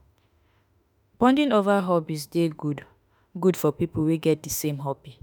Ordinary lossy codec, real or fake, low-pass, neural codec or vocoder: none; fake; none; autoencoder, 48 kHz, 32 numbers a frame, DAC-VAE, trained on Japanese speech